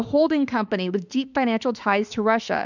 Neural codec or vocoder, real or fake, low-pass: autoencoder, 48 kHz, 32 numbers a frame, DAC-VAE, trained on Japanese speech; fake; 7.2 kHz